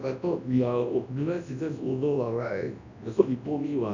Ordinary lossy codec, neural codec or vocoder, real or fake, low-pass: none; codec, 24 kHz, 0.9 kbps, WavTokenizer, large speech release; fake; 7.2 kHz